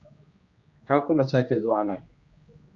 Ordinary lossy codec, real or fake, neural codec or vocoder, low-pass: Opus, 64 kbps; fake; codec, 16 kHz, 1 kbps, X-Codec, HuBERT features, trained on general audio; 7.2 kHz